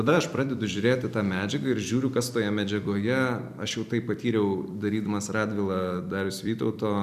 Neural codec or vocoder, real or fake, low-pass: vocoder, 44.1 kHz, 128 mel bands every 256 samples, BigVGAN v2; fake; 14.4 kHz